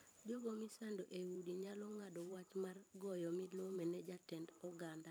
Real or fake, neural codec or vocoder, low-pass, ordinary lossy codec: fake; vocoder, 44.1 kHz, 128 mel bands every 512 samples, BigVGAN v2; none; none